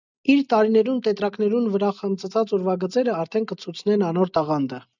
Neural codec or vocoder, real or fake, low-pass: none; real; 7.2 kHz